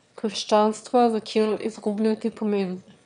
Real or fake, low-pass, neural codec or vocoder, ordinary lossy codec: fake; 9.9 kHz; autoencoder, 22.05 kHz, a latent of 192 numbers a frame, VITS, trained on one speaker; none